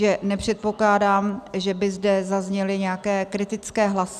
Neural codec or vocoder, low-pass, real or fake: none; 14.4 kHz; real